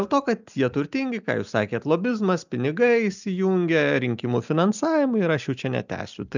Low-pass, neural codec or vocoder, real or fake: 7.2 kHz; none; real